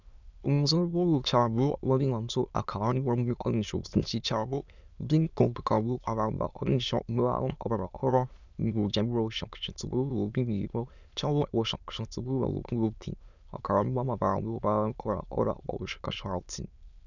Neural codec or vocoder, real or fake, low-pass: autoencoder, 22.05 kHz, a latent of 192 numbers a frame, VITS, trained on many speakers; fake; 7.2 kHz